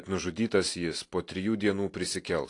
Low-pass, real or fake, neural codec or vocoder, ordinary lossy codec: 10.8 kHz; real; none; AAC, 48 kbps